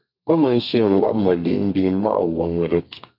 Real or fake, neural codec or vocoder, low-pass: fake; codec, 32 kHz, 1.9 kbps, SNAC; 5.4 kHz